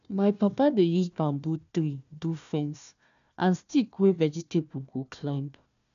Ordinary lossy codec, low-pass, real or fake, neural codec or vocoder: none; 7.2 kHz; fake; codec, 16 kHz, 1 kbps, FunCodec, trained on Chinese and English, 50 frames a second